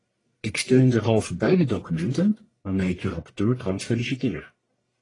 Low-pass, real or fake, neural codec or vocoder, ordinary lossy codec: 10.8 kHz; fake; codec, 44.1 kHz, 1.7 kbps, Pupu-Codec; AAC, 32 kbps